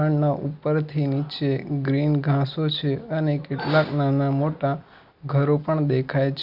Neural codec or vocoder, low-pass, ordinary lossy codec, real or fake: none; 5.4 kHz; Opus, 64 kbps; real